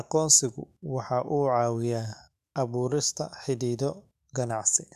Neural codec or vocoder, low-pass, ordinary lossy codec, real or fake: autoencoder, 48 kHz, 128 numbers a frame, DAC-VAE, trained on Japanese speech; 14.4 kHz; none; fake